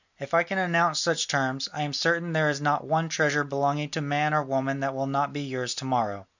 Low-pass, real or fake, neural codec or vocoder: 7.2 kHz; real; none